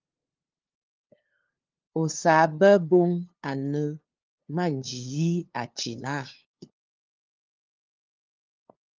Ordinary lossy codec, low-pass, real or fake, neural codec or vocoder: Opus, 32 kbps; 7.2 kHz; fake; codec, 16 kHz, 2 kbps, FunCodec, trained on LibriTTS, 25 frames a second